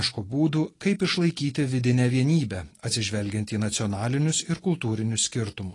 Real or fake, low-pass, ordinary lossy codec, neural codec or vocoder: real; 10.8 kHz; AAC, 32 kbps; none